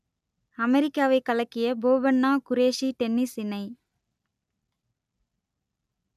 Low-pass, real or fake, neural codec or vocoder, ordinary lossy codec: 14.4 kHz; real; none; none